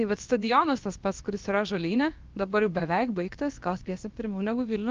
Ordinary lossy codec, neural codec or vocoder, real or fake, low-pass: Opus, 32 kbps; codec, 16 kHz, about 1 kbps, DyCAST, with the encoder's durations; fake; 7.2 kHz